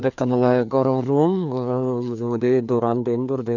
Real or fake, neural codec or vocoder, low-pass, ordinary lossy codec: fake; codec, 16 kHz, 2 kbps, FreqCodec, larger model; 7.2 kHz; none